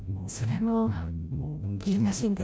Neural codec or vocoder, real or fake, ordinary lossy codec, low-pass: codec, 16 kHz, 0.5 kbps, FreqCodec, larger model; fake; none; none